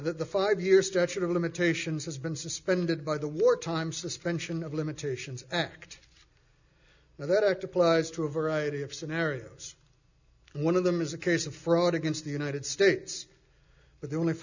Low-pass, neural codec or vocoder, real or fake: 7.2 kHz; none; real